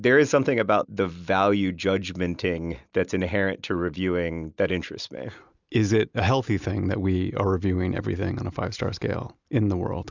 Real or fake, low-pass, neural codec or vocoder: real; 7.2 kHz; none